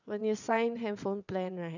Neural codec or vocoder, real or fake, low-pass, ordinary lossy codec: vocoder, 22.05 kHz, 80 mel bands, WaveNeXt; fake; 7.2 kHz; none